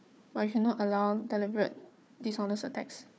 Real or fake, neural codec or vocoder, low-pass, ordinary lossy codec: fake; codec, 16 kHz, 4 kbps, FunCodec, trained on Chinese and English, 50 frames a second; none; none